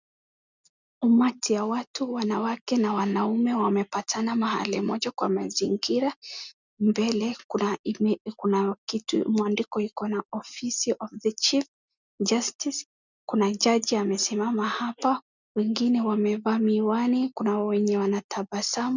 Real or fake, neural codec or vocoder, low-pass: real; none; 7.2 kHz